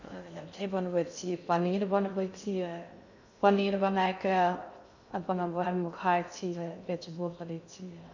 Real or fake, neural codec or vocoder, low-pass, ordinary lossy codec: fake; codec, 16 kHz in and 24 kHz out, 0.6 kbps, FocalCodec, streaming, 2048 codes; 7.2 kHz; none